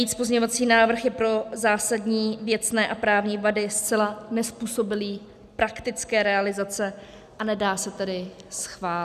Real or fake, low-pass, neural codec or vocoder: real; 14.4 kHz; none